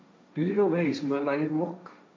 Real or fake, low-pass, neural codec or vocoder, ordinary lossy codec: fake; none; codec, 16 kHz, 1.1 kbps, Voila-Tokenizer; none